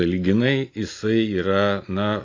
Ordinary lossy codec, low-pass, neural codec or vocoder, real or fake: AAC, 32 kbps; 7.2 kHz; none; real